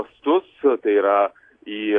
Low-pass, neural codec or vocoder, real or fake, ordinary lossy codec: 9.9 kHz; none; real; AAC, 48 kbps